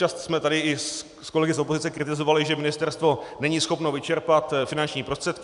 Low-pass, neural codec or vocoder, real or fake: 10.8 kHz; none; real